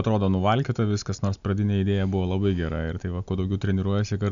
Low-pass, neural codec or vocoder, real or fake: 7.2 kHz; none; real